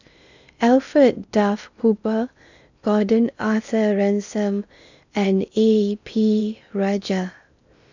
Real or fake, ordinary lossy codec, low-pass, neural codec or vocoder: fake; none; 7.2 kHz; codec, 16 kHz in and 24 kHz out, 0.6 kbps, FocalCodec, streaming, 4096 codes